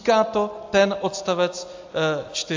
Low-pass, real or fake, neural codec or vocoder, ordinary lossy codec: 7.2 kHz; real; none; AAC, 48 kbps